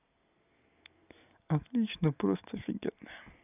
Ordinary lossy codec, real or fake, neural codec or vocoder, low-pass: none; real; none; 3.6 kHz